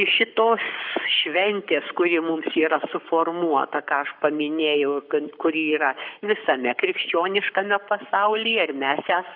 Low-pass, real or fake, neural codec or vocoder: 5.4 kHz; fake; codec, 44.1 kHz, 7.8 kbps, Pupu-Codec